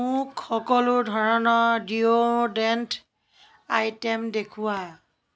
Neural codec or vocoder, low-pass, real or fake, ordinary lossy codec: none; none; real; none